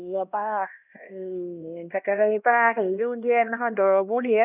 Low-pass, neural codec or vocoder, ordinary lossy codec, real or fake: 3.6 kHz; codec, 16 kHz, 1 kbps, X-Codec, HuBERT features, trained on LibriSpeech; none; fake